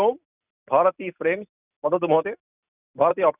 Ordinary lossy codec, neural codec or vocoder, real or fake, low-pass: none; none; real; 3.6 kHz